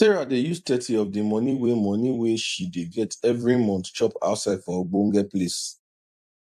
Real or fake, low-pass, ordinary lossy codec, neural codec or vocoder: fake; 14.4 kHz; AAC, 96 kbps; vocoder, 44.1 kHz, 128 mel bands every 256 samples, BigVGAN v2